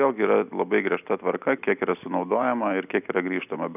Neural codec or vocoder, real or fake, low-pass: none; real; 3.6 kHz